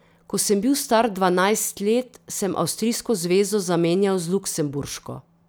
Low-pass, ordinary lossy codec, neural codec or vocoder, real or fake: none; none; none; real